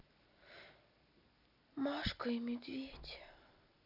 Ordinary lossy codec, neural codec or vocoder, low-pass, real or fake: none; none; 5.4 kHz; real